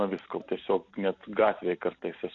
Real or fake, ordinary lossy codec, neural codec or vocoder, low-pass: real; AAC, 64 kbps; none; 7.2 kHz